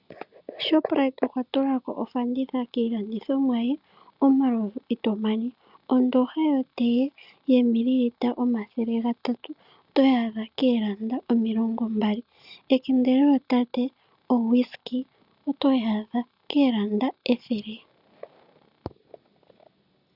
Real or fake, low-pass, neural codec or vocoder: real; 5.4 kHz; none